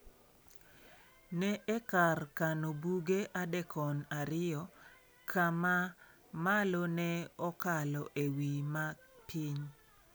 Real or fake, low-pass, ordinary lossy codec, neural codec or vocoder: real; none; none; none